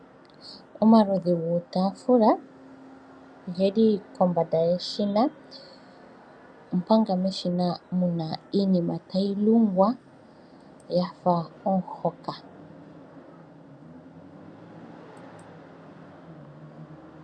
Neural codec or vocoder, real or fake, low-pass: none; real; 9.9 kHz